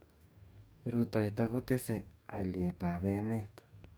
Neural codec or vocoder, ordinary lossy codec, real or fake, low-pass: codec, 44.1 kHz, 2.6 kbps, DAC; none; fake; none